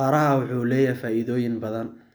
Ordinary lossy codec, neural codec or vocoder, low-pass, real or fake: none; none; none; real